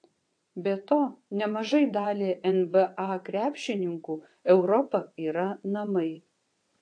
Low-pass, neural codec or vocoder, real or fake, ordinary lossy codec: 9.9 kHz; vocoder, 22.05 kHz, 80 mel bands, WaveNeXt; fake; AAC, 48 kbps